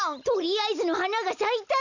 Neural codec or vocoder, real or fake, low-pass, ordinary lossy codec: none; real; 7.2 kHz; none